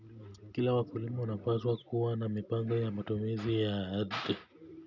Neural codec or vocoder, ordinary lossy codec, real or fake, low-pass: none; none; real; 7.2 kHz